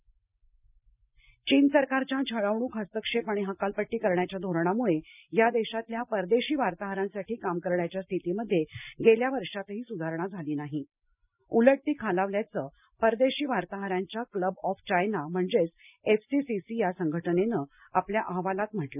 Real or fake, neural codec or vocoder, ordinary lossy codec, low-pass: real; none; none; 3.6 kHz